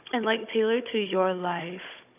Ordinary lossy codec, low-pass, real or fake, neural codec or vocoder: none; 3.6 kHz; fake; codec, 16 kHz, 16 kbps, FunCodec, trained on Chinese and English, 50 frames a second